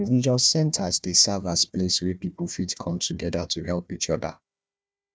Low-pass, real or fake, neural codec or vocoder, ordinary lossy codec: none; fake; codec, 16 kHz, 1 kbps, FunCodec, trained on Chinese and English, 50 frames a second; none